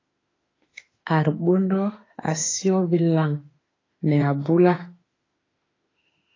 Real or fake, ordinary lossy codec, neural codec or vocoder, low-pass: fake; AAC, 32 kbps; autoencoder, 48 kHz, 32 numbers a frame, DAC-VAE, trained on Japanese speech; 7.2 kHz